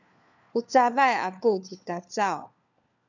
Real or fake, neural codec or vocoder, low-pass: fake; codec, 16 kHz, 4 kbps, FunCodec, trained on LibriTTS, 50 frames a second; 7.2 kHz